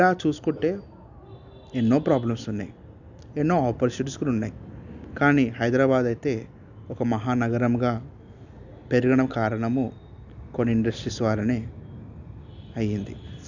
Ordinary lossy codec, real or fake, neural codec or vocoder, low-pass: none; real; none; 7.2 kHz